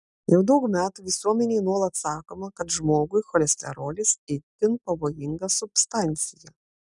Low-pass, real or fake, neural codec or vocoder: 10.8 kHz; real; none